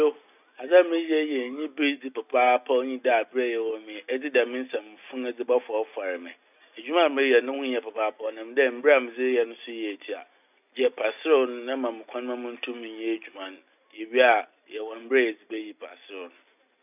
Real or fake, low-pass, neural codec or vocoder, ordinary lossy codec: real; 3.6 kHz; none; none